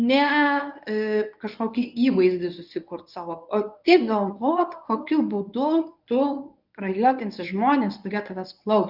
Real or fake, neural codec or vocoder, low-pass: fake; codec, 24 kHz, 0.9 kbps, WavTokenizer, medium speech release version 1; 5.4 kHz